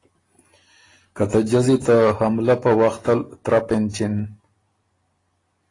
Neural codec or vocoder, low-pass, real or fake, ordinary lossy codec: none; 10.8 kHz; real; AAC, 32 kbps